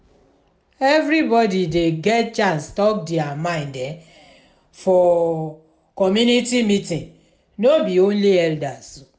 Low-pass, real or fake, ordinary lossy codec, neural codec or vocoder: none; real; none; none